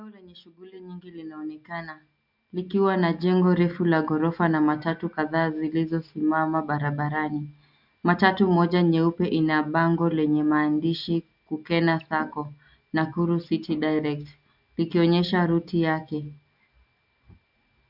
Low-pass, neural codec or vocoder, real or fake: 5.4 kHz; none; real